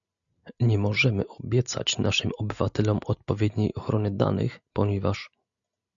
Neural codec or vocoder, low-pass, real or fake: none; 7.2 kHz; real